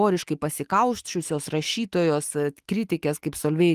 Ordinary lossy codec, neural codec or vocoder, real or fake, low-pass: Opus, 24 kbps; autoencoder, 48 kHz, 128 numbers a frame, DAC-VAE, trained on Japanese speech; fake; 14.4 kHz